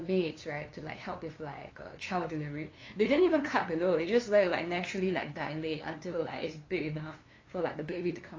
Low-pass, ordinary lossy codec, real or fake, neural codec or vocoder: 7.2 kHz; AAC, 32 kbps; fake; codec, 16 kHz, 2 kbps, FunCodec, trained on LibriTTS, 25 frames a second